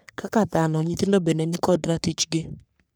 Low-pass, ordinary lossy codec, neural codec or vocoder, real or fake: none; none; codec, 44.1 kHz, 3.4 kbps, Pupu-Codec; fake